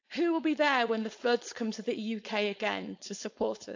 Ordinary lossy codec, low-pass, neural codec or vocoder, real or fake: AAC, 48 kbps; 7.2 kHz; codec, 16 kHz, 4.8 kbps, FACodec; fake